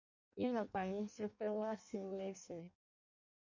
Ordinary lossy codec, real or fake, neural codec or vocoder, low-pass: MP3, 64 kbps; fake; codec, 16 kHz in and 24 kHz out, 0.6 kbps, FireRedTTS-2 codec; 7.2 kHz